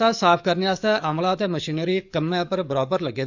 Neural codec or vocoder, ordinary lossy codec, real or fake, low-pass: codec, 44.1 kHz, 7.8 kbps, DAC; none; fake; 7.2 kHz